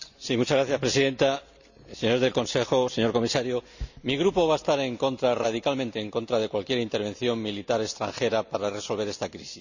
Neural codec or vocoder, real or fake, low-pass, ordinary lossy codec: none; real; 7.2 kHz; none